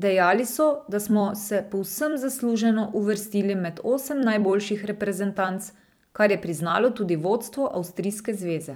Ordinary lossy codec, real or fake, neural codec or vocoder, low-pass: none; fake; vocoder, 44.1 kHz, 128 mel bands every 256 samples, BigVGAN v2; none